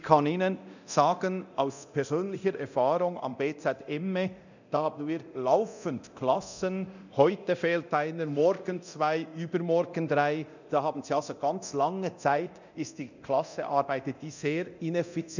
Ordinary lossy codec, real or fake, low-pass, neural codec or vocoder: none; fake; 7.2 kHz; codec, 24 kHz, 0.9 kbps, DualCodec